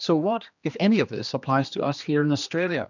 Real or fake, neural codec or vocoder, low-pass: fake; codec, 16 kHz, 2 kbps, X-Codec, HuBERT features, trained on general audio; 7.2 kHz